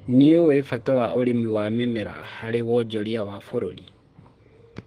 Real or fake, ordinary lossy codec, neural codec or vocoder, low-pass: fake; Opus, 24 kbps; codec, 32 kHz, 1.9 kbps, SNAC; 14.4 kHz